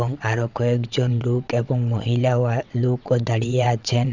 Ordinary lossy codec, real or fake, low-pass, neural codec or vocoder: none; fake; 7.2 kHz; vocoder, 22.05 kHz, 80 mel bands, WaveNeXt